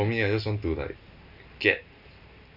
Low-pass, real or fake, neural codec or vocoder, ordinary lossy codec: 5.4 kHz; real; none; none